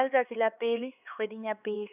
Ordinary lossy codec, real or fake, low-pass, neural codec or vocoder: none; fake; 3.6 kHz; codec, 16 kHz, 4 kbps, X-Codec, HuBERT features, trained on LibriSpeech